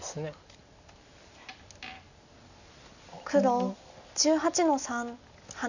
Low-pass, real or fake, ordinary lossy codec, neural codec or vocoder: 7.2 kHz; real; none; none